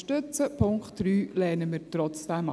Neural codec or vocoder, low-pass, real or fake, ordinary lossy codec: none; none; real; none